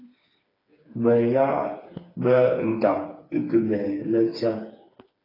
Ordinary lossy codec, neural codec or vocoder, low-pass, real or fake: AAC, 24 kbps; codec, 16 kHz, 4 kbps, FreqCodec, smaller model; 5.4 kHz; fake